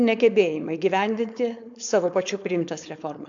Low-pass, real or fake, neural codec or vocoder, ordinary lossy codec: 7.2 kHz; fake; codec, 16 kHz, 4.8 kbps, FACodec; AAC, 64 kbps